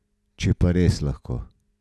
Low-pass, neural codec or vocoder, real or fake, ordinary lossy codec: none; none; real; none